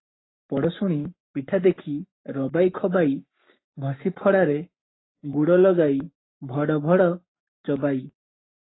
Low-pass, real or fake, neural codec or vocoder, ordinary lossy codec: 7.2 kHz; fake; codec, 44.1 kHz, 7.8 kbps, Pupu-Codec; AAC, 16 kbps